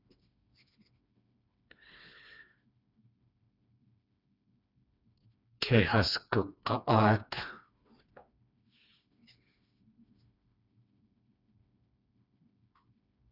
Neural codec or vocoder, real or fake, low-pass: codec, 16 kHz, 2 kbps, FreqCodec, smaller model; fake; 5.4 kHz